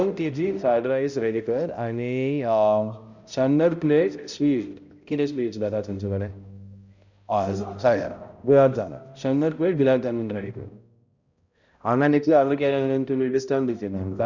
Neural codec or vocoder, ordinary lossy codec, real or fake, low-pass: codec, 16 kHz, 0.5 kbps, X-Codec, HuBERT features, trained on balanced general audio; Opus, 64 kbps; fake; 7.2 kHz